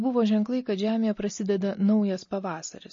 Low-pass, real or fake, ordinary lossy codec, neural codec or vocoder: 7.2 kHz; real; MP3, 32 kbps; none